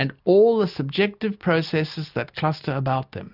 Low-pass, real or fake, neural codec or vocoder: 5.4 kHz; real; none